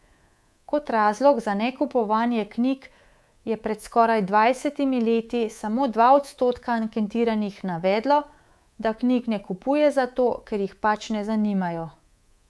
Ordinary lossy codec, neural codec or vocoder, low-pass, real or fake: none; codec, 24 kHz, 3.1 kbps, DualCodec; none; fake